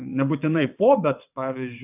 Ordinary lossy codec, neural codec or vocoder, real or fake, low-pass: AAC, 32 kbps; vocoder, 22.05 kHz, 80 mel bands, WaveNeXt; fake; 3.6 kHz